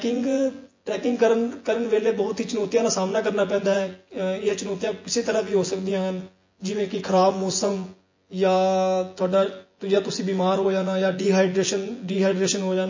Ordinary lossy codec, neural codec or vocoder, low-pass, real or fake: MP3, 32 kbps; vocoder, 24 kHz, 100 mel bands, Vocos; 7.2 kHz; fake